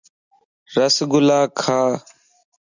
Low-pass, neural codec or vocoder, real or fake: 7.2 kHz; none; real